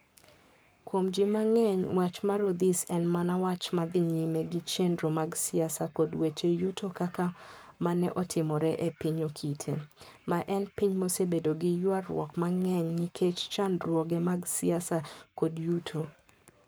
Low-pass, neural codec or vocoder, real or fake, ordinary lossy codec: none; codec, 44.1 kHz, 7.8 kbps, Pupu-Codec; fake; none